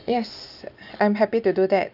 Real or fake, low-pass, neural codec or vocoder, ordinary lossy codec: real; 5.4 kHz; none; none